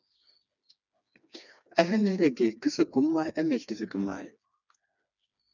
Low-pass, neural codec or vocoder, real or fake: 7.2 kHz; codec, 16 kHz, 2 kbps, FreqCodec, smaller model; fake